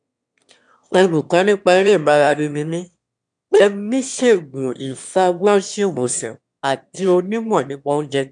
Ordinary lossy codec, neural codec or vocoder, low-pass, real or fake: MP3, 96 kbps; autoencoder, 22.05 kHz, a latent of 192 numbers a frame, VITS, trained on one speaker; 9.9 kHz; fake